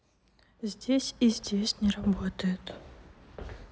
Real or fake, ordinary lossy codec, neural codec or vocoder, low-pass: real; none; none; none